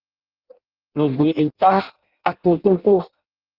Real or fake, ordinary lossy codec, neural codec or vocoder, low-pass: fake; Opus, 32 kbps; codec, 16 kHz in and 24 kHz out, 0.6 kbps, FireRedTTS-2 codec; 5.4 kHz